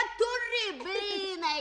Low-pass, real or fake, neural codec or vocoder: 9.9 kHz; real; none